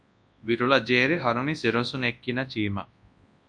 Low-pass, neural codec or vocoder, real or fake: 9.9 kHz; codec, 24 kHz, 0.9 kbps, WavTokenizer, large speech release; fake